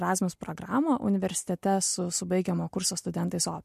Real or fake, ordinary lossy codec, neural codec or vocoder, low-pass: real; MP3, 64 kbps; none; 14.4 kHz